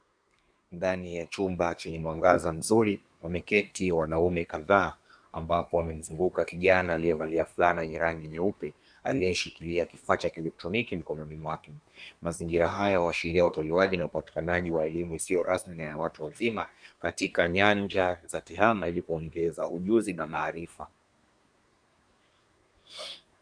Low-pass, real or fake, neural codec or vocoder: 9.9 kHz; fake; codec, 24 kHz, 1 kbps, SNAC